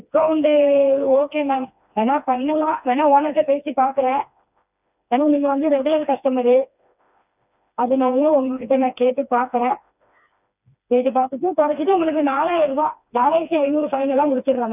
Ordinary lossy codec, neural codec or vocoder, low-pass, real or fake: none; codec, 16 kHz, 2 kbps, FreqCodec, smaller model; 3.6 kHz; fake